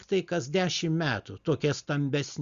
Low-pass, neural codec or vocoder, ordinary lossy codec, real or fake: 7.2 kHz; none; Opus, 64 kbps; real